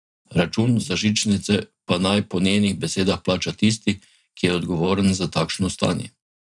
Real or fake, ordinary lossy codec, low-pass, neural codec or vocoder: fake; none; 10.8 kHz; vocoder, 44.1 kHz, 128 mel bands every 512 samples, BigVGAN v2